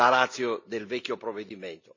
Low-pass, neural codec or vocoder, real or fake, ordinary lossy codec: 7.2 kHz; none; real; MP3, 64 kbps